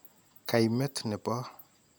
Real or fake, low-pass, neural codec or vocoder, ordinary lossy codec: real; none; none; none